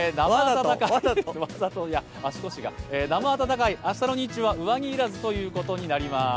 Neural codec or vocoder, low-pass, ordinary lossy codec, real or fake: none; none; none; real